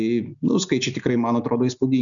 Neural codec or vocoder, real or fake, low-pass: none; real; 7.2 kHz